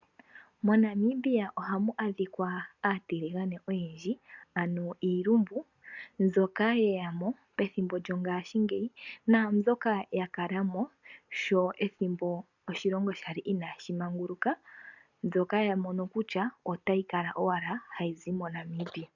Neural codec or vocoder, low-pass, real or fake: none; 7.2 kHz; real